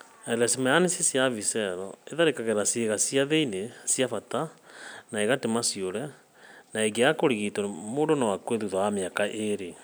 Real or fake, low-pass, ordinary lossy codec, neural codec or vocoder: real; none; none; none